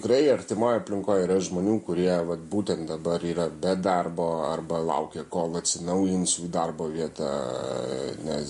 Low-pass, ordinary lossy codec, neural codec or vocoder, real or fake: 14.4 kHz; MP3, 48 kbps; none; real